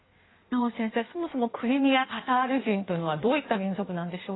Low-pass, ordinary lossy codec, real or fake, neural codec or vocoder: 7.2 kHz; AAC, 16 kbps; fake; codec, 16 kHz in and 24 kHz out, 1.1 kbps, FireRedTTS-2 codec